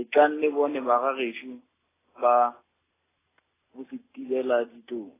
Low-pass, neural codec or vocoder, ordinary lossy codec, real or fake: 3.6 kHz; none; AAC, 16 kbps; real